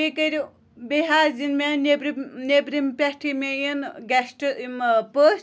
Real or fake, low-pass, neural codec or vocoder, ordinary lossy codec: real; none; none; none